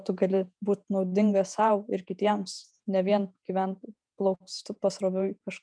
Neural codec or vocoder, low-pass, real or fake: vocoder, 48 kHz, 128 mel bands, Vocos; 9.9 kHz; fake